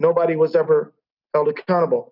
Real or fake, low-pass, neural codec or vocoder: real; 5.4 kHz; none